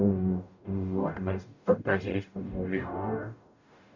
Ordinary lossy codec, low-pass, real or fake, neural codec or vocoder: none; 7.2 kHz; fake; codec, 44.1 kHz, 0.9 kbps, DAC